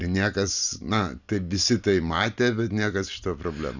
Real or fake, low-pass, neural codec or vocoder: real; 7.2 kHz; none